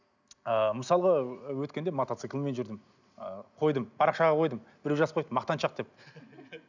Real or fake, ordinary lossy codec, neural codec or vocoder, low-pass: real; none; none; 7.2 kHz